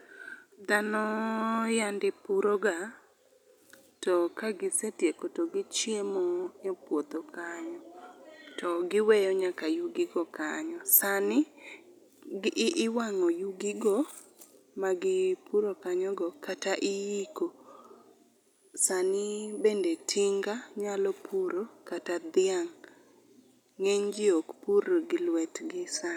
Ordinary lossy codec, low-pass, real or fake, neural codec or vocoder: none; 19.8 kHz; real; none